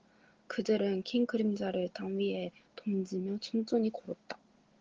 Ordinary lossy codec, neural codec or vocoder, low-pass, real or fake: Opus, 16 kbps; none; 7.2 kHz; real